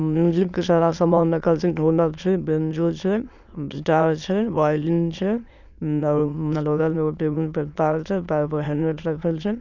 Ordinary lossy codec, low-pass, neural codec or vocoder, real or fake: none; 7.2 kHz; autoencoder, 22.05 kHz, a latent of 192 numbers a frame, VITS, trained on many speakers; fake